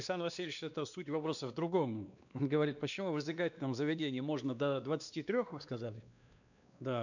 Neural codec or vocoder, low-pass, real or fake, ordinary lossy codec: codec, 16 kHz, 2 kbps, X-Codec, WavLM features, trained on Multilingual LibriSpeech; 7.2 kHz; fake; none